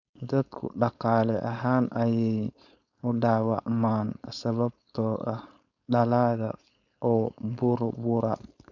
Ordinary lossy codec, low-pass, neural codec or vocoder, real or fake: none; 7.2 kHz; codec, 16 kHz, 4.8 kbps, FACodec; fake